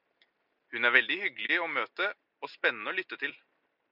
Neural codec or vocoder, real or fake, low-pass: none; real; 5.4 kHz